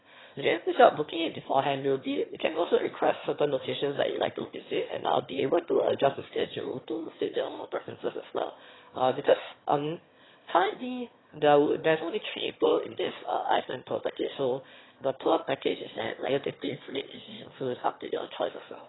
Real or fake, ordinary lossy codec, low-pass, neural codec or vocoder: fake; AAC, 16 kbps; 7.2 kHz; autoencoder, 22.05 kHz, a latent of 192 numbers a frame, VITS, trained on one speaker